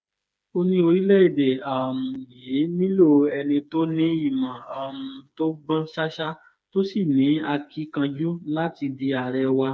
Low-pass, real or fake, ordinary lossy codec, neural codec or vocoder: none; fake; none; codec, 16 kHz, 4 kbps, FreqCodec, smaller model